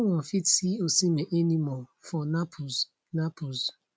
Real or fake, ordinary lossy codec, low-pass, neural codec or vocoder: real; none; none; none